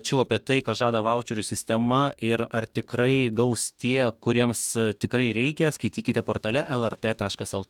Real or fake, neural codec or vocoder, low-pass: fake; codec, 44.1 kHz, 2.6 kbps, DAC; 19.8 kHz